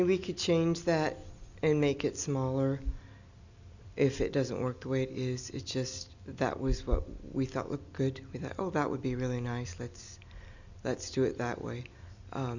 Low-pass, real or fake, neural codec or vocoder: 7.2 kHz; real; none